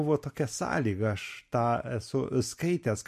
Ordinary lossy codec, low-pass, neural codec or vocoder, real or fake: MP3, 64 kbps; 14.4 kHz; none; real